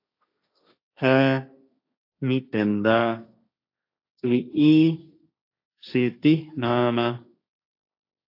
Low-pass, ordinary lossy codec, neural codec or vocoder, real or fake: 5.4 kHz; AAC, 32 kbps; codec, 16 kHz, 1.1 kbps, Voila-Tokenizer; fake